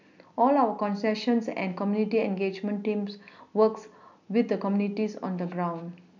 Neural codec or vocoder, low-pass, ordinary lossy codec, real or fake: none; 7.2 kHz; none; real